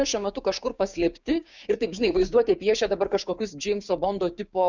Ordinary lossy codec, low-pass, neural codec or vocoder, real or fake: Opus, 64 kbps; 7.2 kHz; codec, 16 kHz, 6 kbps, DAC; fake